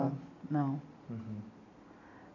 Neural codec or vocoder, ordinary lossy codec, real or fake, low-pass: none; AAC, 48 kbps; real; 7.2 kHz